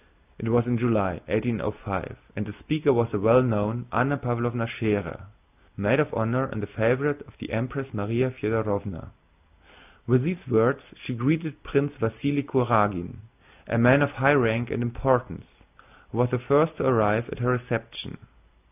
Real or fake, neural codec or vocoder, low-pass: real; none; 3.6 kHz